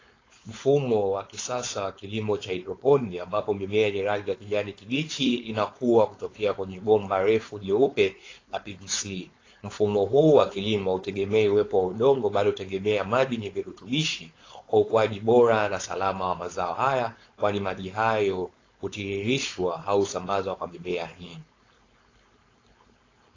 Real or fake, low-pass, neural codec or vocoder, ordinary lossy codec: fake; 7.2 kHz; codec, 16 kHz, 4.8 kbps, FACodec; AAC, 32 kbps